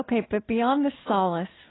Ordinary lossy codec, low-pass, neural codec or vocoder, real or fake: AAC, 16 kbps; 7.2 kHz; none; real